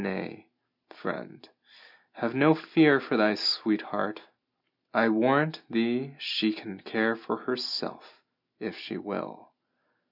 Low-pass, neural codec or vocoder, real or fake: 5.4 kHz; none; real